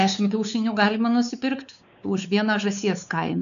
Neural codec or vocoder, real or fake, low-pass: codec, 16 kHz, 4 kbps, X-Codec, WavLM features, trained on Multilingual LibriSpeech; fake; 7.2 kHz